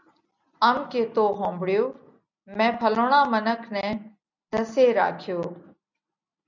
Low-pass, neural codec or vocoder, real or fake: 7.2 kHz; none; real